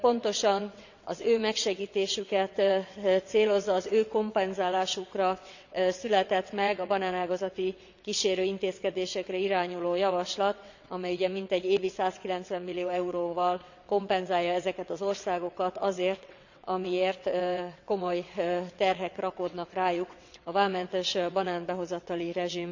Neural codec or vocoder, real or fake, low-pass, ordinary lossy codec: vocoder, 22.05 kHz, 80 mel bands, WaveNeXt; fake; 7.2 kHz; none